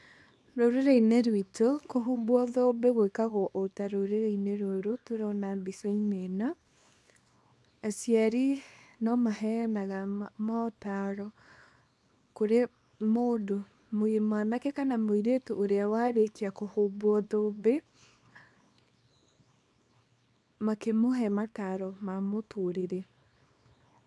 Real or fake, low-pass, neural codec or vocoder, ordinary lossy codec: fake; none; codec, 24 kHz, 0.9 kbps, WavTokenizer, small release; none